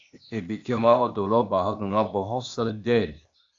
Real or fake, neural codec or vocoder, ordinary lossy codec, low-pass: fake; codec, 16 kHz, 0.8 kbps, ZipCodec; AAC, 64 kbps; 7.2 kHz